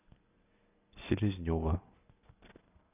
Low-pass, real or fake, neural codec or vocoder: 3.6 kHz; real; none